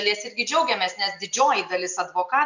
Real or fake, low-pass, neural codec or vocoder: real; 7.2 kHz; none